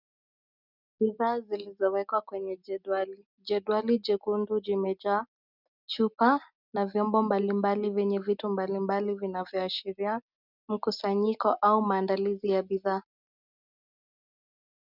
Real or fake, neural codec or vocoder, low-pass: real; none; 5.4 kHz